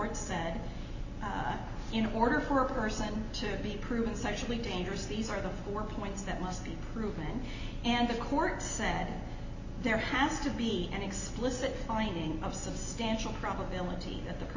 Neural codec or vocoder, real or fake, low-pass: none; real; 7.2 kHz